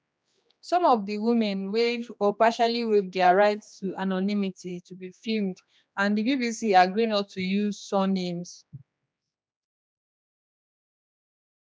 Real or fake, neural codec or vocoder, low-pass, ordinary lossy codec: fake; codec, 16 kHz, 2 kbps, X-Codec, HuBERT features, trained on general audio; none; none